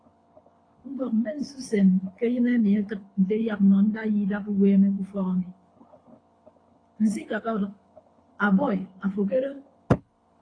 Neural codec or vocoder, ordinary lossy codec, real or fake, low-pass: codec, 24 kHz, 6 kbps, HILCodec; AAC, 32 kbps; fake; 9.9 kHz